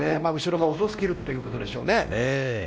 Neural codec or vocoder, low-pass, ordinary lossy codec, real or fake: codec, 16 kHz, 1 kbps, X-Codec, WavLM features, trained on Multilingual LibriSpeech; none; none; fake